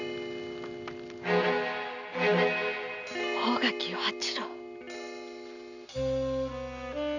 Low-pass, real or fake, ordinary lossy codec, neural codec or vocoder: 7.2 kHz; real; none; none